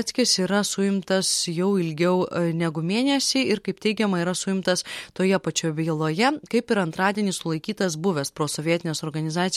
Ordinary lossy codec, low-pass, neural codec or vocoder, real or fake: MP3, 64 kbps; 19.8 kHz; none; real